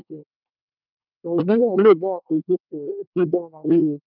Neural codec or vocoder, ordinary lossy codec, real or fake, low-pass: codec, 24 kHz, 1 kbps, SNAC; none; fake; 5.4 kHz